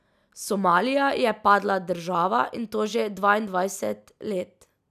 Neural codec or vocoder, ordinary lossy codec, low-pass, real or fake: none; none; 14.4 kHz; real